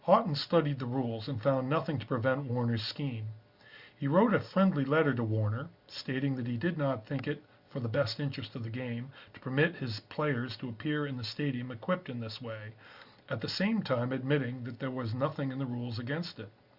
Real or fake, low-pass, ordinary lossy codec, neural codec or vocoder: real; 5.4 kHz; Opus, 64 kbps; none